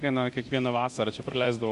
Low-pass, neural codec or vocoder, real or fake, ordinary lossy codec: 10.8 kHz; codec, 24 kHz, 0.9 kbps, DualCodec; fake; Opus, 64 kbps